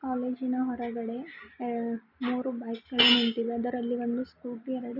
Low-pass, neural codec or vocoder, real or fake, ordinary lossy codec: 5.4 kHz; none; real; none